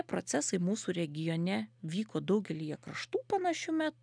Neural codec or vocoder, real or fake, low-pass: none; real; 9.9 kHz